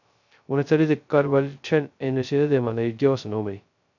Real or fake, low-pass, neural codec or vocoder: fake; 7.2 kHz; codec, 16 kHz, 0.2 kbps, FocalCodec